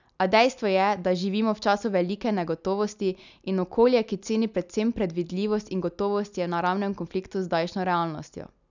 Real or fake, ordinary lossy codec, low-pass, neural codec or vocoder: real; none; 7.2 kHz; none